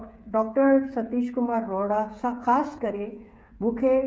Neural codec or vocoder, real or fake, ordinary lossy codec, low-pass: codec, 16 kHz, 8 kbps, FreqCodec, smaller model; fake; none; none